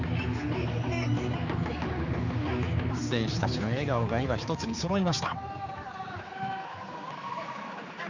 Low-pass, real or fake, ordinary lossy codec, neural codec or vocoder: 7.2 kHz; fake; none; codec, 16 kHz, 4 kbps, X-Codec, HuBERT features, trained on general audio